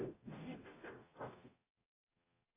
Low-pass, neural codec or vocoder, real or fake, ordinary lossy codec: 3.6 kHz; codec, 44.1 kHz, 0.9 kbps, DAC; fake; AAC, 16 kbps